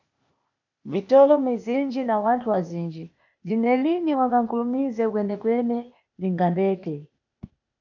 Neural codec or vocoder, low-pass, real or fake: codec, 16 kHz, 0.8 kbps, ZipCodec; 7.2 kHz; fake